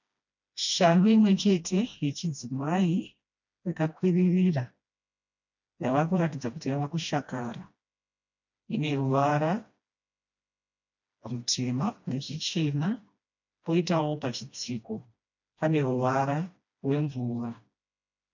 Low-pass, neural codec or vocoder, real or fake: 7.2 kHz; codec, 16 kHz, 1 kbps, FreqCodec, smaller model; fake